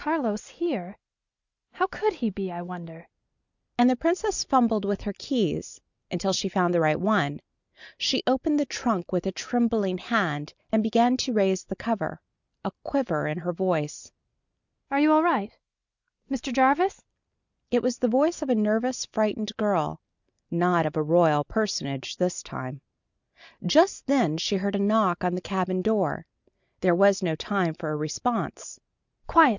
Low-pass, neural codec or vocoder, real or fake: 7.2 kHz; none; real